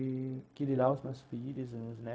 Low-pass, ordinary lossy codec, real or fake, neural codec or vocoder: none; none; fake; codec, 16 kHz, 0.4 kbps, LongCat-Audio-Codec